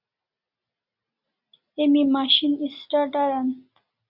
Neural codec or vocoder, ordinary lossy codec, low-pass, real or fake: none; Opus, 64 kbps; 5.4 kHz; real